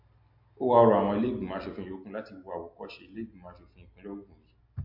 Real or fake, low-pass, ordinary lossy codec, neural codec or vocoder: real; 5.4 kHz; MP3, 32 kbps; none